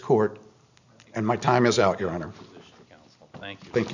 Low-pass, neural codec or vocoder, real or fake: 7.2 kHz; none; real